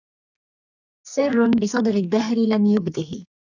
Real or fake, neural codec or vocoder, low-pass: fake; codec, 44.1 kHz, 2.6 kbps, SNAC; 7.2 kHz